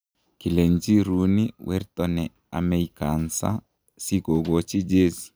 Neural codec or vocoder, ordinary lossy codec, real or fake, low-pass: none; none; real; none